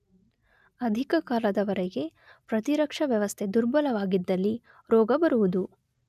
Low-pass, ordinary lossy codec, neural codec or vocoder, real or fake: 14.4 kHz; none; none; real